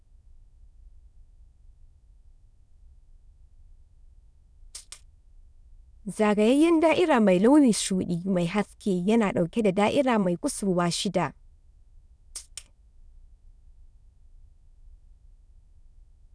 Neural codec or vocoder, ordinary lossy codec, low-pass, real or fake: autoencoder, 22.05 kHz, a latent of 192 numbers a frame, VITS, trained on many speakers; none; none; fake